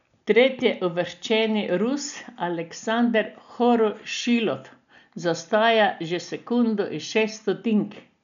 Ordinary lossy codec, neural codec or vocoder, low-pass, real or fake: none; none; 7.2 kHz; real